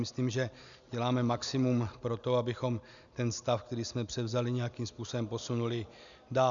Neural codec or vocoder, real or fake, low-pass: none; real; 7.2 kHz